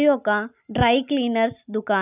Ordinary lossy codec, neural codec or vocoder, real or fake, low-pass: none; none; real; 3.6 kHz